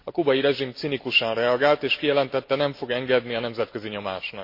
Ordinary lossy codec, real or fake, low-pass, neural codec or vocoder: MP3, 32 kbps; real; 5.4 kHz; none